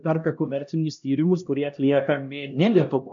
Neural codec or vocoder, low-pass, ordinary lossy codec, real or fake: codec, 16 kHz, 1 kbps, X-Codec, HuBERT features, trained on LibriSpeech; 7.2 kHz; MP3, 64 kbps; fake